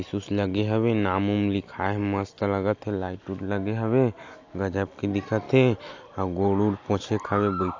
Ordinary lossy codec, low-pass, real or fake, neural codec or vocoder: AAC, 48 kbps; 7.2 kHz; real; none